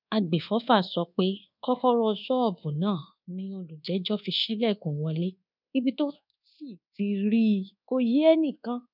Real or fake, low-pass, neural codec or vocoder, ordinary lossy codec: fake; 5.4 kHz; codec, 24 kHz, 1.2 kbps, DualCodec; none